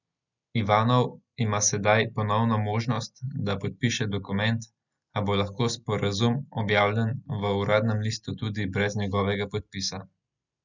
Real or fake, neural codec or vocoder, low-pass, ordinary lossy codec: real; none; 7.2 kHz; none